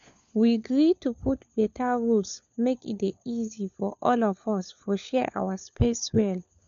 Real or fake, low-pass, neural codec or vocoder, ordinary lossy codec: fake; 7.2 kHz; codec, 16 kHz, 4 kbps, FunCodec, trained on LibriTTS, 50 frames a second; none